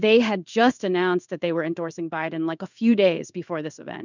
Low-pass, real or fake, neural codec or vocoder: 7.2 kHz; fake; codec, 16 kHz in and 24 kHz out, 1 kbps, XY-Tokenizer